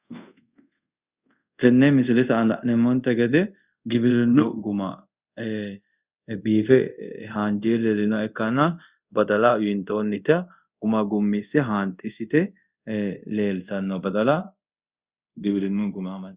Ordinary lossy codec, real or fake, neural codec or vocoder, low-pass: Opus, 64 kbps; fake; codec, 24 kHz, 0.5 kbps, DualCodec; 3.6 kHz